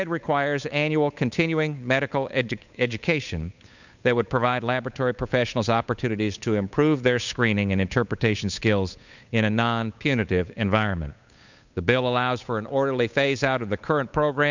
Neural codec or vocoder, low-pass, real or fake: codec, 16 kHz, 8 kbps, FunCodec, trained on Chinese and English, 25 frames a second; 7.2 kHz; fake